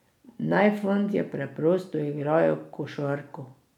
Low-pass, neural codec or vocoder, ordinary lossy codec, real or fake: 19.8 kHz; none; none; real